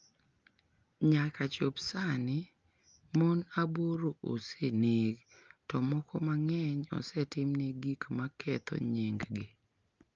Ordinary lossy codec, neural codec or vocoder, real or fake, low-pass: Opus, 24 kbps; none; real; 7.2 kHz